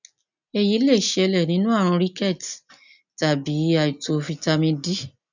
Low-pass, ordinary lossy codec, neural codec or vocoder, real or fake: 7.2 kHz; none; none; real